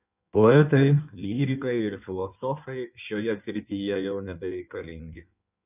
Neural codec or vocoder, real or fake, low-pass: codec, 16 kHz in and 24 kHz out, 1.1 kbps, FireRedTTS-2 codec; fake; 3.6 kHz